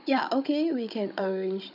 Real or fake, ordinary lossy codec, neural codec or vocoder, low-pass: fake; none; codec, 16 kHz, 16 kbps, FunCodec, trained on Chinese and English, 50 frames a second; 5.4 kHz